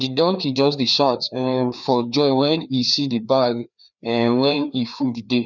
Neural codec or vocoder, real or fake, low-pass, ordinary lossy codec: codec, 16 kHz, 2 kbps, FreqCodec, larger model; fake; 7.2 kHz; none